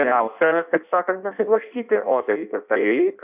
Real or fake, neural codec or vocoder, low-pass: fake; codec, 16 kHz in and 24 kHz out, 0.6 kbps, FireRedTTS-2 codec; 3.6 kHz